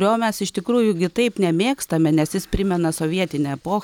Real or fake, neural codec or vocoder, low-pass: real; none; 19.8 kHz